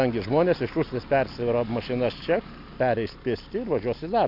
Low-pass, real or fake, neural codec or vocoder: 5.4 kHz; real; none